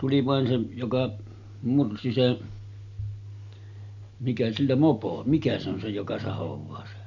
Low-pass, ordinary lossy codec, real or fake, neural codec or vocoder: 7.2 kHz; none; real; none